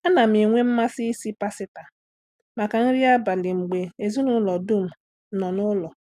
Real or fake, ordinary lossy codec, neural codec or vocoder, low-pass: real; none; none; 14.4 kHz